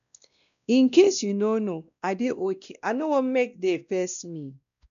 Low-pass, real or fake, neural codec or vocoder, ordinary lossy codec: 7.2 kHz; fake; codec, 16 kHz, 1 kbps, X-Codec, WavLM features, trained on Multilingual LibriSpeech; none